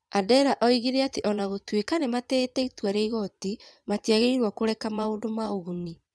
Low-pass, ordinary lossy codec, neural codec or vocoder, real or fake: none; none; vocoder, 22.05 kHz, 80 mel bands, Vocos; fake